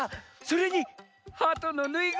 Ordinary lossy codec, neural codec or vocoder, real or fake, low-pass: none; none; real; none